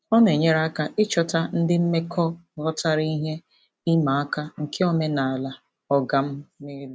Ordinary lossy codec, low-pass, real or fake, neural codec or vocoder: none; none; real; none